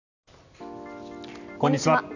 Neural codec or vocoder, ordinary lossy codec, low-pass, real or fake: none; none; 7.2 kHz; real